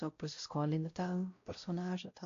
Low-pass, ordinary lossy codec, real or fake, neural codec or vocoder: 7.2 kHz; AAC, 48 kbps; fake; codec, 16 kHz, 0.5 kbps, X-Codec, WavLM features, trained on Multilingual LibriSpeech